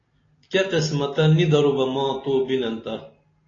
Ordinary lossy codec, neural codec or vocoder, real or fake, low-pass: AAC, 32 kbps; none; real; 7.2 kHz